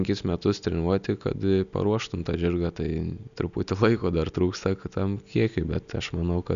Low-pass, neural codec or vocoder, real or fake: 7.2 kHz; none; real